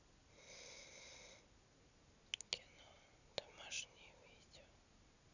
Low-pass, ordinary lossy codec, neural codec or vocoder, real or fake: 7.2 kHz; none; none; real